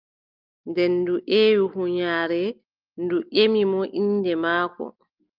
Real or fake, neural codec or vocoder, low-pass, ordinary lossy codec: real; none; 5.4 kHz; Opus, 32 kbps